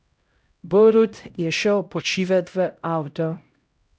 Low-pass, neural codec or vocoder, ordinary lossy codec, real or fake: none; codec, 16 kHz, 0.5 kbps, X-Codec, HuBERT features, trained on LibriSpeech; none; fake